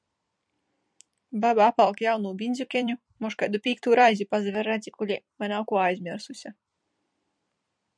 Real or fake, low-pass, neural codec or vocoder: fake; 9.9 kHz; vocoder, 44.1 kHz, 128 mel bands every 256 samples, BigVGAN v2